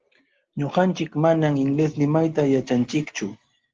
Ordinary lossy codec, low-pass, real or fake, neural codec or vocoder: Opus, 16 kbps; 7.2 kHz; real; none